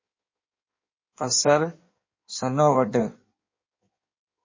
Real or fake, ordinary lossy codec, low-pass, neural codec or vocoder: fake; MP3, 32 kbps; 7.2 kHz; codec, 16 kHz in and 24 kHz out, 1.1 kbps, FireRedTTS-2 codec